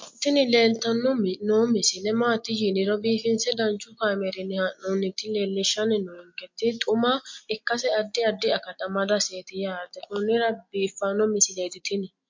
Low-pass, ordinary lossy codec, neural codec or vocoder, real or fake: 7.2 kHz; MP3, 48 kbps; none; real